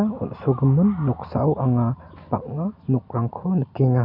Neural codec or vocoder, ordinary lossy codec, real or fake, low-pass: none; none; real; 5.4 kHz